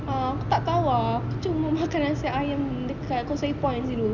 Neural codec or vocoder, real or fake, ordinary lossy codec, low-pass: none; real; none; 7.2 kHz